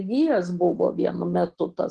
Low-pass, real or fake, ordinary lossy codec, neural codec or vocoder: 10.8 kHz; real; Opus, 16 kbps; none